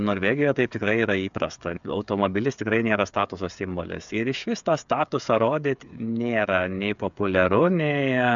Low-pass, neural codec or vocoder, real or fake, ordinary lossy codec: 7.2 kHz; codec, 16 kHz, 8 kbps, FreqCodec, smaller model; fake; MP3, 96 kbps